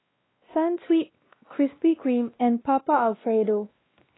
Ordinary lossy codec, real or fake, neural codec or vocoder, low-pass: AAC, 16 kbps; fake; codec, 16 kHz, 1 kbps, X-Codec, WavLM features, trained on Multilingual LibriSpeech; 7.2 kHz